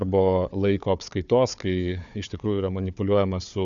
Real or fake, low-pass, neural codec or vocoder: fake; 7.2 kHz; codec, 16 kHz, 4 kbps, FunCodec, trained on Chinese and English, 50 frames a second